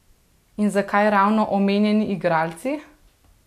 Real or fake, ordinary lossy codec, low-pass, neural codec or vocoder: real; none; 14.4 kHz; none